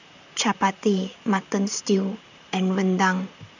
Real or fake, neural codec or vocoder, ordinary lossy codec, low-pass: fake; vocoder, 44.1 kHz, 128 mel bands, Pupu-Vocoder; none; 7.2 kHz